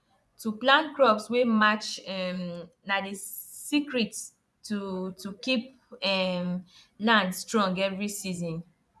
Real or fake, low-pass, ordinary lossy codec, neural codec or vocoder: fake; none; none; vocoder, 24 kHz, 100 mel bands, Vocos